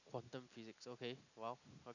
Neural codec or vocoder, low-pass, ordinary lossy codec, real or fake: none; 7.2 kHz; none; real